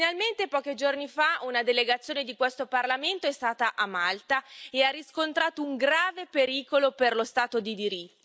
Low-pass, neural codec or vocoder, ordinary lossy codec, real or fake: none; none; none; real